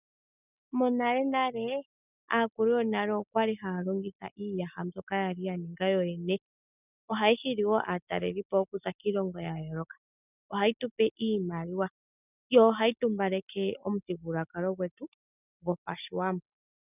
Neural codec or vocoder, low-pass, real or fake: none; 3.6 kHz; real